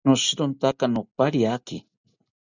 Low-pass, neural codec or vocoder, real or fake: 7.2 kHz; none; real